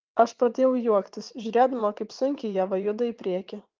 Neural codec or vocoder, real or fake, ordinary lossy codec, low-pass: vocoder, 22.05 kHz, 80 mel bands, WaveNeXt; fake; Opus, 32 kbps; 7.2 kHz